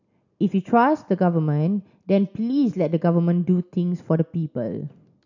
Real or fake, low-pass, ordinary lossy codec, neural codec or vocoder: real; 7.2 kHz; none; none